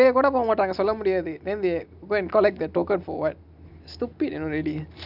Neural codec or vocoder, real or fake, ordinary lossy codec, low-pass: none; real; none; 5.4 kHz